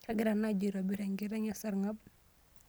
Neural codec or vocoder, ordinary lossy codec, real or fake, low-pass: none; none; real; none